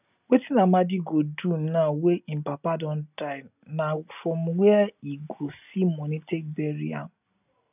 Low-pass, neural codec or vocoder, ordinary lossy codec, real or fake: 3.6 kHz; none; none; real